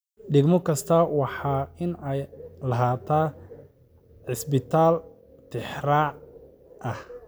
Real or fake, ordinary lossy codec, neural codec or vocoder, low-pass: real; none; none; none